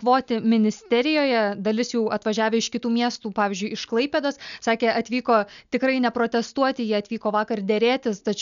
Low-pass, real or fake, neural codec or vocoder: 7.2 kHz; real; none